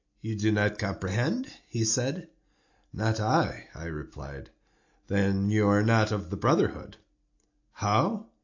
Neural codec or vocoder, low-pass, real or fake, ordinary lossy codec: none; 7.2 kHz; real; AAC, 48 kbps